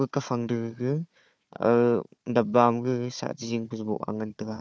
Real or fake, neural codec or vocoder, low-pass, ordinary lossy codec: fake; codec, 16 kHz, 4 kbps, FunCodec, trained on Chinese and English, 50 frames a second; none; none